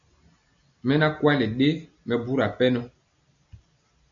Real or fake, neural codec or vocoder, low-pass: real; none; 7.2 kHz